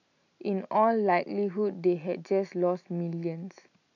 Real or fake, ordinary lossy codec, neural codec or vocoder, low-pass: real; none; none; 7.2 kHz